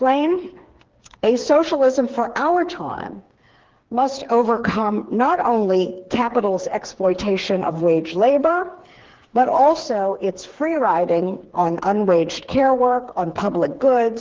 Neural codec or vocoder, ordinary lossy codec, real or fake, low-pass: codec, 16 kHz, 4 kbps, FreqCodec, smaller model; Opus, 16 kbps; fake; 7.2 kHz